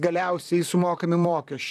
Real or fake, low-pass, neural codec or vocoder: fake; 14.4 kHz; vocoder, 44.1 kHz, 128 mel bands, Pupu-Vocoder